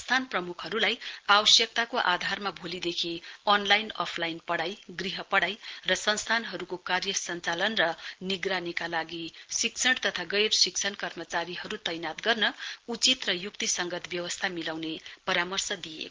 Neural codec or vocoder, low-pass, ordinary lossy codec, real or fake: none; 7.2 kHz; Opus, 16 kbps; real